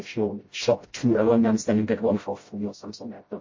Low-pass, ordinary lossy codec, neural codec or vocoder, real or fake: 7.2 kHz; MP3, 32 kbps; codec, 16 kHz, 0.5 kbps, FreqCodec, smaller model; fake